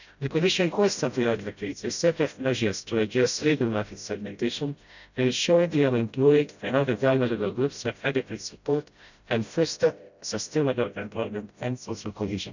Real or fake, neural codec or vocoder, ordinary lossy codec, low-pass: fake; codec, 16 kHz, 0.5 kbps, FreqCodec, smaller model; none; 7.2 kHz